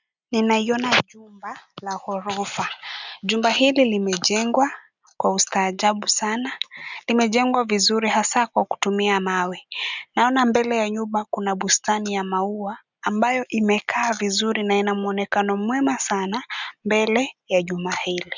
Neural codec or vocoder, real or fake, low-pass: none; real; 7.2 kHz